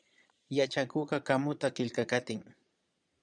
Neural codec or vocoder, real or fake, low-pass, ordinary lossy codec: vocoder, 22.05 kHz, 80 mel bands, Vocos; fake; 9.9 kHz; MP3, 96 kbps